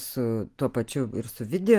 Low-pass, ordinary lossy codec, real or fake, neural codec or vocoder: 14.4 kHz; Opus, 24 kbps; real; none